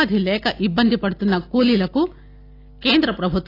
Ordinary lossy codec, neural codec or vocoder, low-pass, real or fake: AAC, 32 kbps; none; 5.4 kHz; real